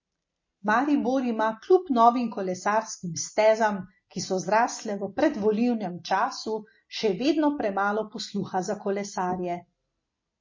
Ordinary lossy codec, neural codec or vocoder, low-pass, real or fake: MP3, 32 kbps; none; 7.2 kHz; real